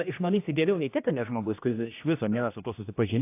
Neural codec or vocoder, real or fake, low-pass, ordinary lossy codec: codec, 16 kHz, 1 kbps, X-Codec, HuBERT features, trained on general audio; fake; 3.6 kHz; AAC, 24 kbps